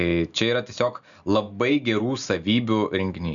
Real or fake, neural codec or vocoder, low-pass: real; none; 7.2 kHz